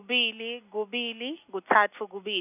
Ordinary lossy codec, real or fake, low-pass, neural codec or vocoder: none; real; 3.6 kHz; none